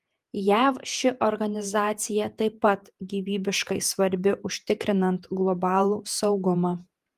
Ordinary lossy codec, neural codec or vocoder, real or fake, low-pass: Opus, 32 kbps; vocoder, 48 kHz, 128 mel bands, Vocos; fake; 14.4 kHz